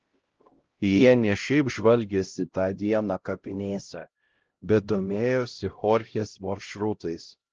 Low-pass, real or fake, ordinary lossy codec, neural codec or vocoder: 7.2 kHz; fake; Opus, 16 kbps; codec, 16 kHz, 0.5 kbps, X-Codec, HuBERT features, trained on LibriSpeech